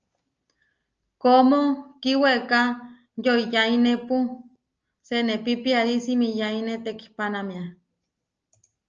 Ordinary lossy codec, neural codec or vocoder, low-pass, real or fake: Opus, 24 kbps; none; 7.2 kHz; real